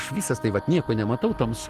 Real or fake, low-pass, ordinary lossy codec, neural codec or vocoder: real; 14.4 kHz; Opus, 16 kbps; none